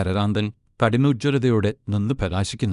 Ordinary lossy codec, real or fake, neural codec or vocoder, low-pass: none; fake; codec, 24 kHz, 0.9 kbps, WavTokenizer, small release; 10.8 kHz